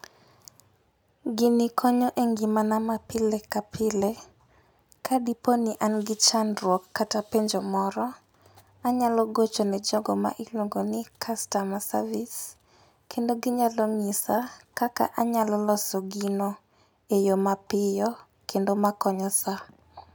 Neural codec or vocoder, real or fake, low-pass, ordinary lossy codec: none; real; none; none